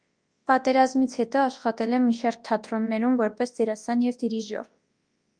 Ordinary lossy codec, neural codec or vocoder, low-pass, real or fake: Opus, 32 kbps; codec, 24 kHz, 0.9 kbps, WavTokenizer, large speech release; 9.9 kHz; fake